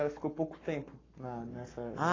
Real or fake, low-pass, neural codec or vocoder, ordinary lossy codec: fake; 7.2 kHz; codec, 44.1 kHz, 7.8 kbps, Pupu-Codec; AAC, 32 kbps